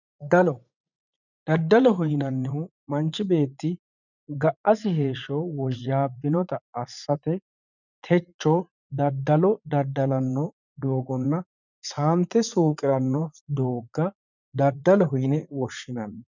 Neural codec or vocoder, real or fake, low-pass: vocoder, 44.1 kHz, 80 mel bands, Vocos; fake; 7.2 kHz